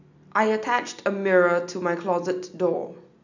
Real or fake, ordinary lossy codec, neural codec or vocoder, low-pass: real; none; none; 7.2 kHz